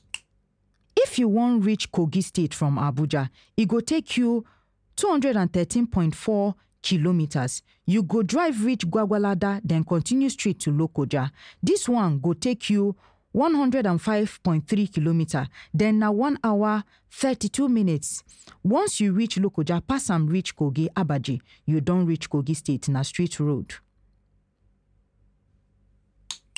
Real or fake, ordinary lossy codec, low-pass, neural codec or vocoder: real; none; 9.9 kHz; none